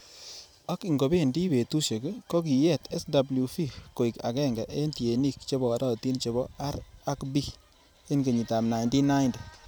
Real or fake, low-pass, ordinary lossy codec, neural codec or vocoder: fake; none; none; vocoder, 44.1 kHz, 128 mel bands every 256 samples, BigVGAN v2